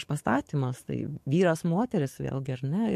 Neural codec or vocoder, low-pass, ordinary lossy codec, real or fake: codec, 44.1 kHz, 7.8 kbps, DAC; 14.4 kHz; MP3, 64 kbps; fake